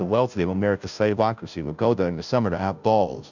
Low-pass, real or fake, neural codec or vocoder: 7.2 kHz; fake; codec, 16 kHz, 0.5 kbps, FunCodec, trained on Chinese and English, 25 frames a second